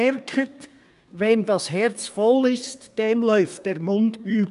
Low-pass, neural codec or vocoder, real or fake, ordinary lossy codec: 10.8 kHz; codec, 24 kHz, 1 kbps, SNAC; fake; none